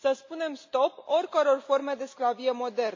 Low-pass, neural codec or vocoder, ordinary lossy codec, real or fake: 7.2 kHz; none; MP3, 48 kbps; real